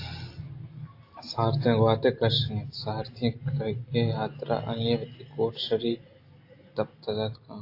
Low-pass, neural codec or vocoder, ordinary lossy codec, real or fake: 5.4 kHz; none; AAC, 32 kbps; real